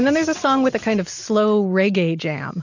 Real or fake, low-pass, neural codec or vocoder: real; 7.2 kHz; none